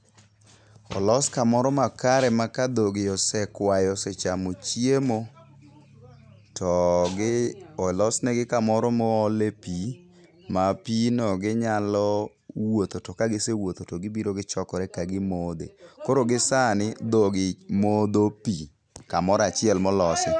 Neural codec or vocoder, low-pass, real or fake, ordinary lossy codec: none; 9.9 kHz; real; none